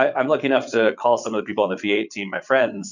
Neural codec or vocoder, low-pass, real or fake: vocoder, 22.05 kHz, 80 mel bands, Vocos; 7.2 kHz; fake